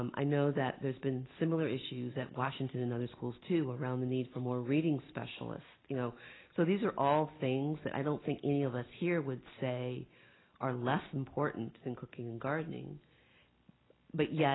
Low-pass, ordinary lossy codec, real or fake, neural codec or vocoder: 7.2 kHz; AAC, 16 kbps; real; none